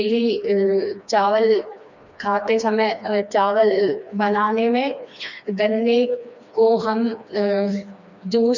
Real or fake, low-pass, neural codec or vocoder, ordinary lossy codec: fake; 7.2 kHz; codec, 16 kHz, 2 kbps, FreqCodec, smaller model; none